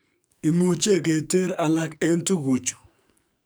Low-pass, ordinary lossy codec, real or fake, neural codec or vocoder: none; none; fake; codec, 44.1 kHz, 2.6 kbps, SNAC